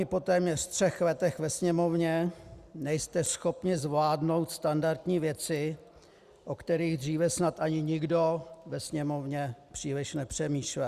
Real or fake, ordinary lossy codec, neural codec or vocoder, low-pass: real; Opus, 64 kbps; none; 14.4 kHz